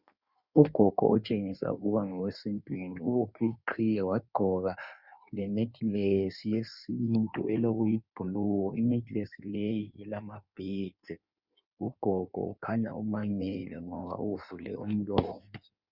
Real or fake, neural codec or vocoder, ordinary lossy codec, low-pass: fake; codec, 16 kHz in and 24 kHz out, 1.1 kbps, FireRedTTS-2 codec; Opus, 64 kbps; 5.4 kHz